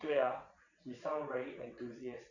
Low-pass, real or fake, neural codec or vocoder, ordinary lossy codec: 7.2 kHz; fake; vocoder, 22.05 kHz, 80 mel bands, WaveNeXt; none